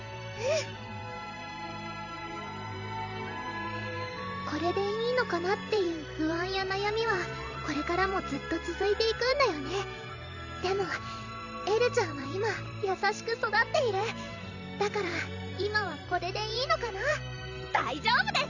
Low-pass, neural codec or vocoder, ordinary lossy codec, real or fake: 7.2 kHz; vocoder, 44.1 kHz, 128 mel bands every 512 samples, BigVGAN v2; none; fake